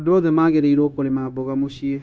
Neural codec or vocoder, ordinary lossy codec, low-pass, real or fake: codec, 16 kHz, 0.9 kbps, LongCat-Audio-Codec; none; none; fake